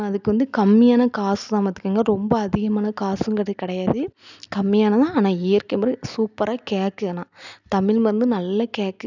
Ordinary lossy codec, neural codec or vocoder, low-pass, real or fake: none; none; 7.2 kHz; real